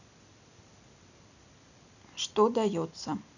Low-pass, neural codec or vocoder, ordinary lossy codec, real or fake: 7.2 kHz; none; none; real